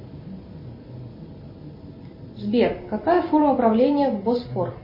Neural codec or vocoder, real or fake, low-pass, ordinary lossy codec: none; real; 5.4 kHz; MP3, 24 kbps